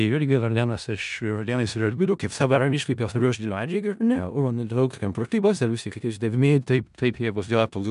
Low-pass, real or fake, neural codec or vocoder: 10.8 kHz; fake; codec, 16 kHz in and 24 kHz out, 0.4 kbps, LongCat-Audio-Codec, four codebook decoder